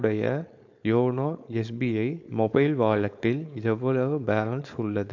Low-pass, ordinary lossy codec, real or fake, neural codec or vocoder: 7.2 kHz; AAC, 48 kbps; fake; codec, 16 kHz, 4.8 kbps, FACodec